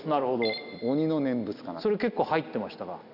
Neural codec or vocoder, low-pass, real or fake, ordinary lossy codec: none; 5.4 kHz; real; none